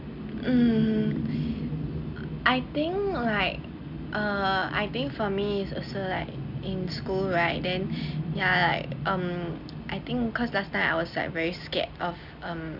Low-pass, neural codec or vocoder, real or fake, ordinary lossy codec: 5.4 kHz; none; real; none